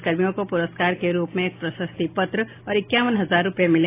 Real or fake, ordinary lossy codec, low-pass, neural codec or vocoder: real; AAC, 24 kbps; 3.6 kHz; none